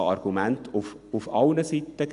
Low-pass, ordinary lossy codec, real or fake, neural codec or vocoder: 10.8 kHz; none; real; none